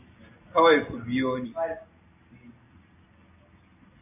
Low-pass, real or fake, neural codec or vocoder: 3.6 kHz; real; none